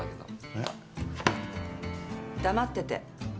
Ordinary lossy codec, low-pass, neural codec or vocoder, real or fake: none; none; none; real